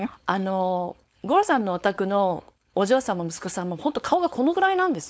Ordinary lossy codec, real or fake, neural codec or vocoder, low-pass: none; fake; codec, 16 kHz, 4.8 kbps, FACodec; none